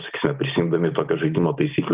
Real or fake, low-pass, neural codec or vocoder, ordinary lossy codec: real; 3.6 kHz; none; Opus, 32 kbps